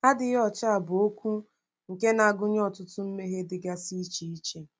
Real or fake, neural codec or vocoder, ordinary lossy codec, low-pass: real; none; none; none